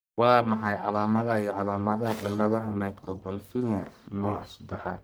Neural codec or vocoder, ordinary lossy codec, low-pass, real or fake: codec, 44.1 kHz, 1.7 kbps, Pupu-Codec; none; none; fake